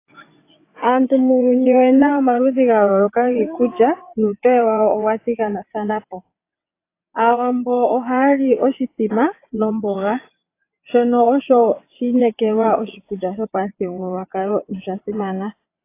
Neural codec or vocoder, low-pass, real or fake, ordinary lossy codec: vocoder, 22.05 kHz, 80 mel bands, WaveNeXt; 3.6 kHz; fake; AAC, 24 kbps